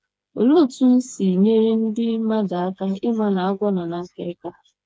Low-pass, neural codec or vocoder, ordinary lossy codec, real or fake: none; codec, 16 kHz, 4 kbps, FreqCodec, smaller model; none; fake